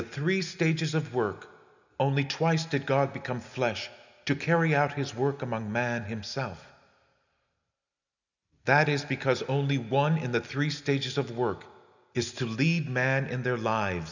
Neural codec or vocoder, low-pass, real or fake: none; 7.2 kHz; real